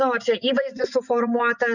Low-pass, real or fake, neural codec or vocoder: 7.2 kHz; fake; vocoder, 24 kHz, 100 mel bands, Vocos